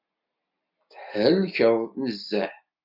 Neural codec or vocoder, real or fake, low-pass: none; real; 5.4 kHz